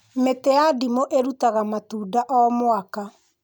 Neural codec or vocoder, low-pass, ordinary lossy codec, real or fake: none; none; none; real